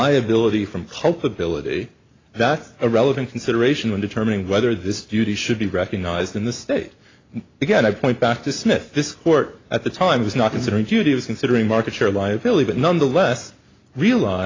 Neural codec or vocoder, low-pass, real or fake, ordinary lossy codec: none; 7.2 kHz; real; AAC, 32 kbps